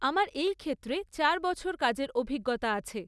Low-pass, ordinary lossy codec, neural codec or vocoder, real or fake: none; none; none; real